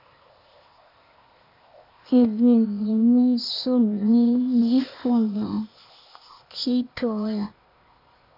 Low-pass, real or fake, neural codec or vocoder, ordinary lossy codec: 5.4 kHz; fake; codec, 16 kHz, 0.8 kbps, ZipCodec; AAC, 48 kbps